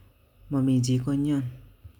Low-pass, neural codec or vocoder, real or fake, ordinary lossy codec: 19.8 kHz; none; real; none